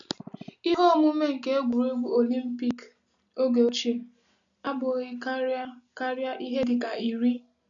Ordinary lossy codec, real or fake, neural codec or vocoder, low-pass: none; real; none; 7.2 kHz